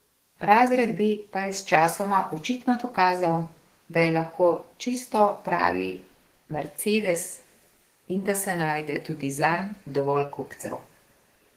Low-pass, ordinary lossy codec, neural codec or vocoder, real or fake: 14.4 kHz; Opus, 16 kbps; codec, 32 kHz, 1.9 kbps, SNAC; fake